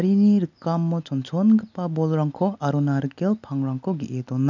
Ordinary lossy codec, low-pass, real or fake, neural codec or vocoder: none; 7.2 kHz; real; none